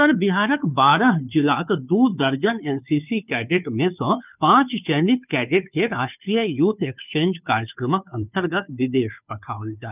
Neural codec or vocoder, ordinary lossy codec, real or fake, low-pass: codec, 16 kHz, 2 kbps, FunCodec, trained on Chinese and English, 25 frames a second; none; fake; 3.6 kHz